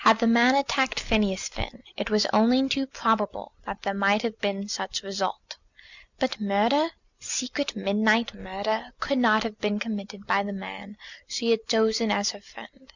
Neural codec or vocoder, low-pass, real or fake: none; 7.2 kHz; real